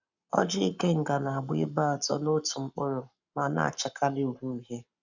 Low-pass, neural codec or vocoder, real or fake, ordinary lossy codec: 7.2 kHz; codec, 44.1 kHz, 7.8 kbps, Pupu-Codec; fake; none